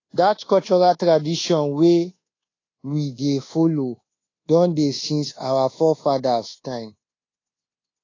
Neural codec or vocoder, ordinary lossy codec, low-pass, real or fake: codec, 24 kHz, 1.2 kbps, DualCodec; AAC, 32 kbps; 7.2 kHz; fake